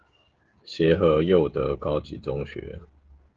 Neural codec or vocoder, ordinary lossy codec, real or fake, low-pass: codec, 16 kHz, 16 kbps, FreqCodec, smaller model; Opus, 16 kbps; fake; 7.2 kHz